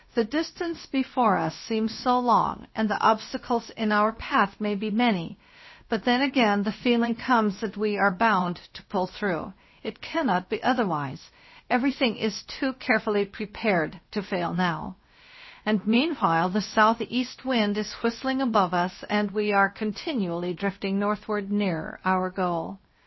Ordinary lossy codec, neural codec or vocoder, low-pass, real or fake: MP3, 24 kbps; codec, 16 kHz, about 1 kbps, DyCAST, with the encoder's durations; 7.2 kHz; fake